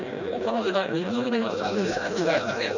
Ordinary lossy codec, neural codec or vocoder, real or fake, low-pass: none; codec, 16 kHz, 1 kbps, FreqCodec, smaller model; fake; 7.2 kHz